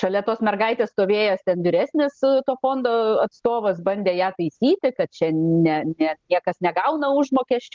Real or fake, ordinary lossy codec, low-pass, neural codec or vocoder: real; Opus, 16 kbps; 7.2 kHz; none